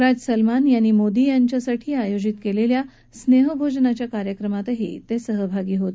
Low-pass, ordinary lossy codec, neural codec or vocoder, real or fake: none; none; none; real